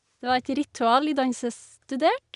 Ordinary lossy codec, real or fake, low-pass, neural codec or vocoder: none; real; 10.8 kHz; none